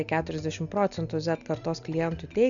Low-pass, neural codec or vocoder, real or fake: 7.2 kHz; none; real